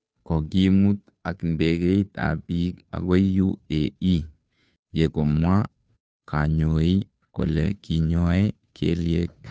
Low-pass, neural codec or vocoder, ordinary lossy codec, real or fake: none; codec, 16 kHz, 2 kbps, FunCodec, trained on Chinese and English, 25 frames a second; none; fake